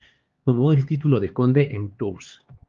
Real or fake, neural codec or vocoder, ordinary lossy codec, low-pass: fake; codec, 16 kHz, 2 kbps, X-Codec, HuBERT features, trained on LibriSpeech; Opus, 32 kbps; 7.2 kHz